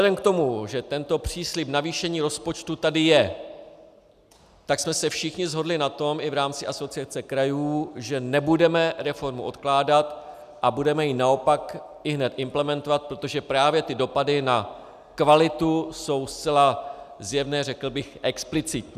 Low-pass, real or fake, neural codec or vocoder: 14.4 kHz; real; none